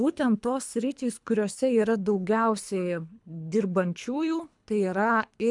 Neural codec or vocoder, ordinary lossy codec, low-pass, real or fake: codec, 24 kHz, 3 kbps, HILCodec; MP3, 96 kbps; 10.8 kHz; fake